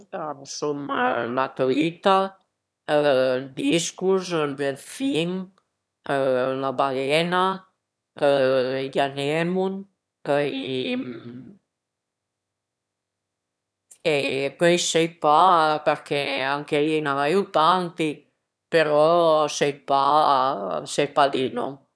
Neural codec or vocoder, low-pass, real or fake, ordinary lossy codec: autoencoder, 22.05 kHz, a latent of 192 numbers a frame, VITS, trained on one speaker; none; fake; none